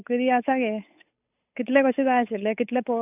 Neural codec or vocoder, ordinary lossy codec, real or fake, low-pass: codec, 16 kHz, 8 kbps, FunCodec, trained on Chinese and English, 25 frames a second; none; fake; 3.6 kHz